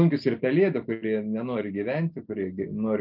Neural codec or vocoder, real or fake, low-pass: none; real; 5.4 kHz